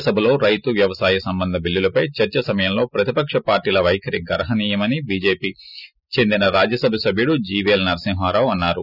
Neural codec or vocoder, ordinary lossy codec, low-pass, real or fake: none; none; 5.4 kHz; real